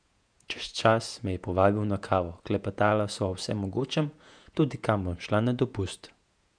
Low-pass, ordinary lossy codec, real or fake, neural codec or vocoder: 9.9 kHz; none; fake; vocoder, 48 kHz, 128 mel bands, Vocos